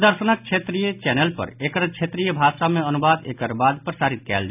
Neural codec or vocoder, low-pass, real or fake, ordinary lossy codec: none; 3.6 kHz; real; none